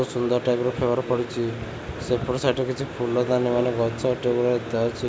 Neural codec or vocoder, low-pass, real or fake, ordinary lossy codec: none; none; real; none